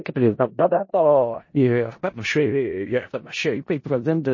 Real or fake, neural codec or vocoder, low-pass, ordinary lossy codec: fake; codec, 16 kHz in and 24 kHz out, 0.4 kbps, LongCat-Audio-Codec, four codebook decoder; 7.2 kHz; MP3, 32 kbps